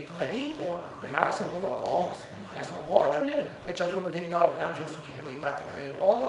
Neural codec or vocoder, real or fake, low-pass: codec, 24 kHz, 0.9 kbps, WavTokenizer, small release; fake; 10.8 kHz